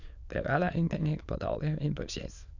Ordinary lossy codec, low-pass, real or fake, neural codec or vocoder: none; 7.2 kHz; fake; autoencoder, 22.05 kHz, a latent of 192 numbers a frame, VITS, trained on many speakers